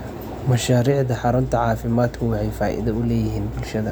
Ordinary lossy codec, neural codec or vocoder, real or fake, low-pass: none; none; real; none